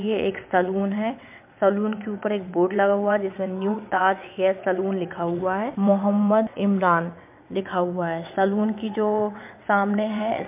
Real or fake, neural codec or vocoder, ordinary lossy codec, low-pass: fake; vocoder, 22.05 kHz, 80 mel bands, WaveNeXt; MP3, 32 kbps; 3.6 kHz